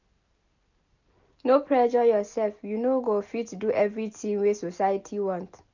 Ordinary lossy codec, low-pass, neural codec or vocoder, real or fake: none; 7.2 kHz; none; real